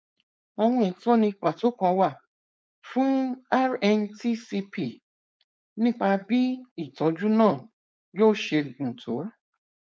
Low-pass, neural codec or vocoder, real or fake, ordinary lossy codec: none; codec, 16 kHz, 4.8 kbps, FACodec; fake; none